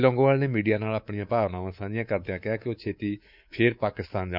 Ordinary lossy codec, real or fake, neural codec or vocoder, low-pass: none; fake; codec, 24 kHz, 3.1 kbps, DualCodec; 5.4 kHz